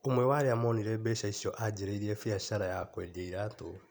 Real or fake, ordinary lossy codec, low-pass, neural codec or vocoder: fake; none; none; vocoder, 44.1 kHz, 128 mel bands every 256 samples, BigVGAN v2